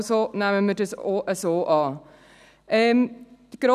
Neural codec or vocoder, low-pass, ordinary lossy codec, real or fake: none; 14.4 kHz; none; real